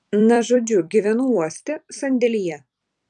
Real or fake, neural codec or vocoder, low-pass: fake; vocoder, 44.1 kHz, 128 mel bands every 256 samples, BigVGAN v2; 10.8 kHz